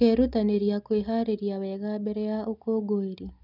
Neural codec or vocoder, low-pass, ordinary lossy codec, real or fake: none; 5.4 kHz; none; real